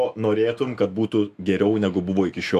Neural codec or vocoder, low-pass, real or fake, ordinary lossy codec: autoencoder, 48 kHz, 128 numbers a frame, DAC-VAE, trained on Japanese speech; 14.4 kHz; fake; MP3, 96 kbps